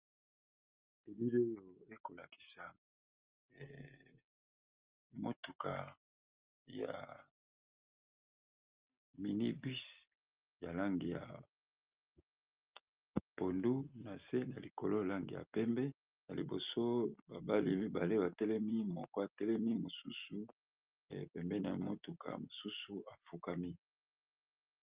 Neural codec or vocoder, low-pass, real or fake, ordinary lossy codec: none; 3.6 kHz; real; Opus, 24 kbps